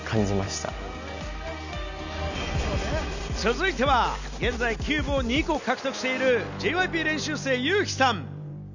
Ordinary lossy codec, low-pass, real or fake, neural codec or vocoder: none; 7.2 kHz; real; none